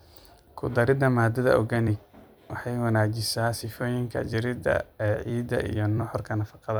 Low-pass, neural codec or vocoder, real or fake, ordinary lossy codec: none; vocoder, 44.1 kHz, 128 mel bands every 256 samples, BigVGAN v2; fake; none